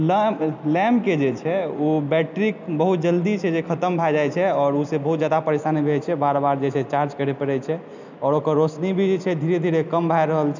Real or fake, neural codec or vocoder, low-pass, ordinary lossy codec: real; none; 7.2 kHz; none